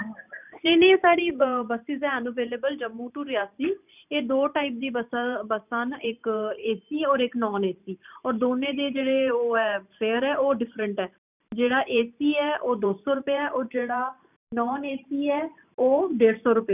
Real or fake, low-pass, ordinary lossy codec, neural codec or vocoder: fake; 3.6 kHz; none; vocoder, 44.1 kHz, 128 mel bands every 512 samples, BigVGAN v2